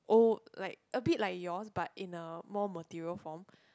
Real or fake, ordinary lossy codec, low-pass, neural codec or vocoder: real; none; none; none